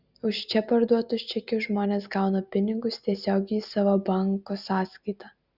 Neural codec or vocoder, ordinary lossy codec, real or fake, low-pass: none; Opus, 64 kbps; real; 5.4 kHz